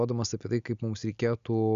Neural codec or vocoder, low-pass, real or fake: none; 7.2 kHz; real